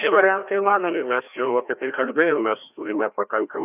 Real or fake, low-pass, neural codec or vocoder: fake; 3.6 kHz; codec, 16 kHz, 1 kbps, FreqCodec, larger model